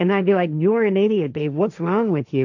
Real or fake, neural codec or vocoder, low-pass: fake; codec, 16 kHz, 1.1 kbps, Voila-Tokenizer; 7.2 kHz